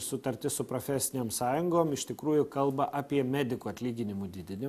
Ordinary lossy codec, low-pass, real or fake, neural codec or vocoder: AAC, 96 kbps; 14.4 kHz; real; none